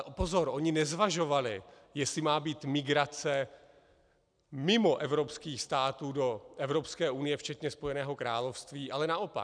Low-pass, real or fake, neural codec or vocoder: 9.9 kHz; real; none